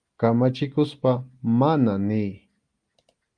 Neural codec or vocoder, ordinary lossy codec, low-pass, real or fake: none; Opus, 32 kbps; 9.9 kHz; real